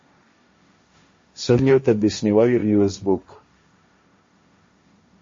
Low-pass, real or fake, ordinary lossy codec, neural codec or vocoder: 7.2 kHz; fake; MP3, 32 kbps; codec, 16 kHz, 1.1 kbps, Voila-Tokenizer